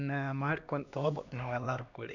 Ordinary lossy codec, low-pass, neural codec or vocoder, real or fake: none; 7.2 kHz; codec, 16 kHz, 2 kbps, X-Codec, HuBERT features, trained on LibriSpeech; fake